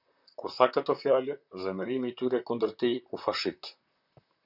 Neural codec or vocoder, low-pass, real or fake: vocoder, 44.1 kHz, 128 mel bands, Pupu-Vocoder; 5.4 kHz; fake